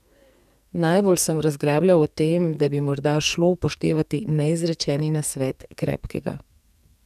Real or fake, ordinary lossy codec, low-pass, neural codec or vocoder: fake; none; 14.4 kHz; codec, 44.1 kHz, 2.6 kbps, SNAC